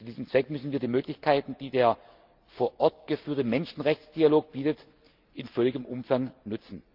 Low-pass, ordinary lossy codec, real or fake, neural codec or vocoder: 5.4 kHz; Opus, 24 kbps; real; none